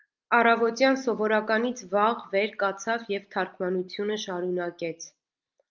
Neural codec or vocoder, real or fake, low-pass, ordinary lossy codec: none; real; 7.2 kHz; Opus, 24 kbps